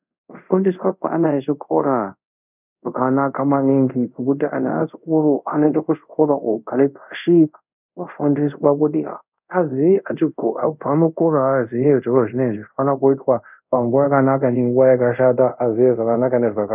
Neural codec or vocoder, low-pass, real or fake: codec, 24 kHz, 0.5 kbps, DualCodec; 3.6 kHz; fake